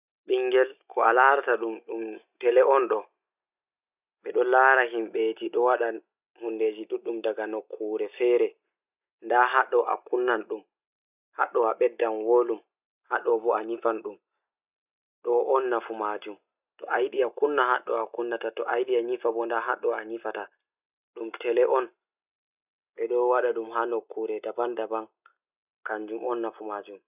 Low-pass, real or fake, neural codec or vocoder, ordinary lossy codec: 3.6 kHz; real; none; none